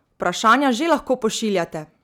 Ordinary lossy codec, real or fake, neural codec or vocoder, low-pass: none; real; none; 19.8 kHz